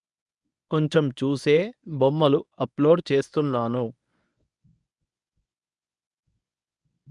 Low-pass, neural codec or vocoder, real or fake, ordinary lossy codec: 10.8 kHz; codec, 24 kHz, 0.9 kbps, WavTokenizer, medium speech release version 1; fake; none